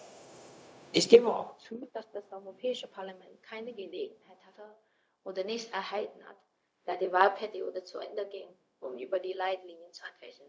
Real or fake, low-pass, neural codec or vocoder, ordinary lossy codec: fake; none; codec, 16 kHz, 0.4 kbps, LongCat-Audio-Codec; none